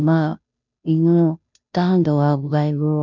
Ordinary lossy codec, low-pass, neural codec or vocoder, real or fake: none; 7.2 kHz; codec, 16 kHz, 0.5 kbps, FunCodec, trained on Chinese and English, 25 frames a second; fake